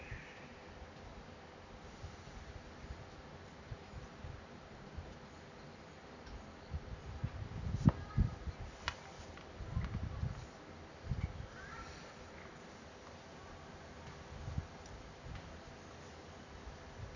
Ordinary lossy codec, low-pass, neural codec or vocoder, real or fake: none; 7.2 kHz; none; real